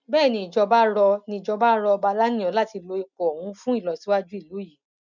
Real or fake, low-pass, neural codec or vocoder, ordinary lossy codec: real; 7.2 kHz; none; none